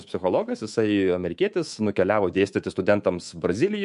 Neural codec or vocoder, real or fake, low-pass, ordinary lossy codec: codec, 24 kHz, 3.1 kbps, DualCodec; fake; 10.8 kHz; MP3, 64 kbps